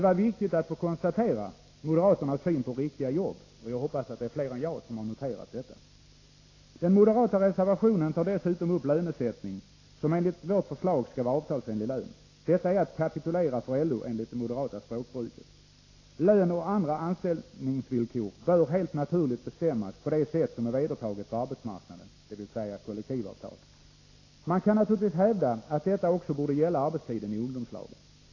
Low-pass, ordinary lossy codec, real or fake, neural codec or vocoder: 7.2 kHz; AAC, 32 kbps; real; none